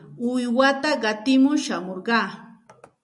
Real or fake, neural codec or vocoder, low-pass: real; none; 10.8 kHz